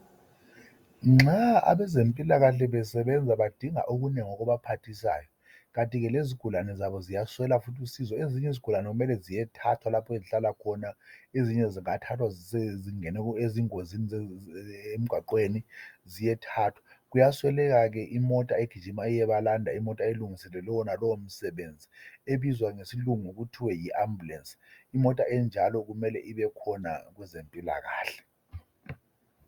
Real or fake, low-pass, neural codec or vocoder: real; 19.8 kHz; none